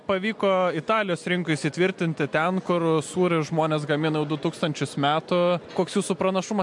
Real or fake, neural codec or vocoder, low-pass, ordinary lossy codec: real; none; 10.8 kHz; MP3, 64 kbps